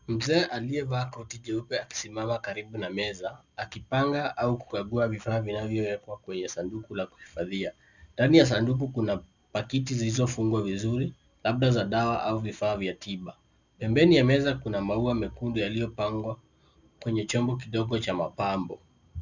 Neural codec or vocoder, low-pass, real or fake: none; 7.2 kHz; real